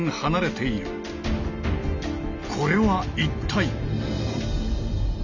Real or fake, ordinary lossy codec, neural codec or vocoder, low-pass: real; none; none; 7.2 kHz